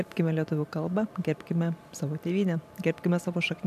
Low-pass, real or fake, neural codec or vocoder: 14.4 kHz; fake; vocoder, 44.1 kHz, 128 mel bands every 512 samples, BigVGAN v2